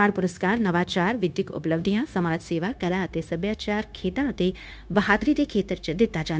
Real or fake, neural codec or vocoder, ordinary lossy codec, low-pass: fake; codec, 16 kHz, 0.9 kbps, LongCat-Audio-Codec; none; none